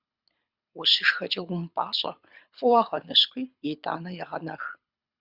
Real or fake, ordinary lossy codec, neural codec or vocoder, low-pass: fake; Opus, 64 kbps; codec, 24 kHz, 6 kbps, HILCodec; 5.4 kHz